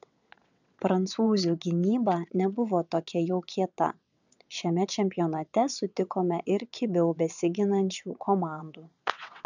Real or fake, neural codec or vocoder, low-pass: fake; vocoder, 22.05 kHz, 80 mel bands, WaveNeXt; 7.2 kHz